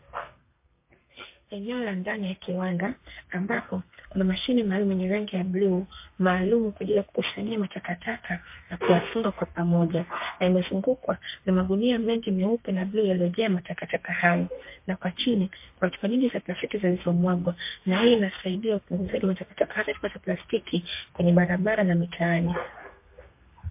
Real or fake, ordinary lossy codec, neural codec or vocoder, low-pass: fake; MP3, 24 kbps; codec, 32 kHz, 1.9 kbps, SNAC; 3.6 kHz